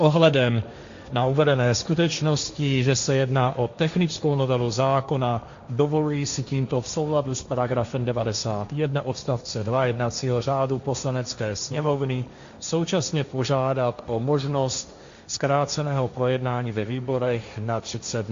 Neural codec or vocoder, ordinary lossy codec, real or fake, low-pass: codec, 16 kHz, 1.1 kbps, Voila-Tokenizer; Opus, 64 kbps; fake; 7.2 kHz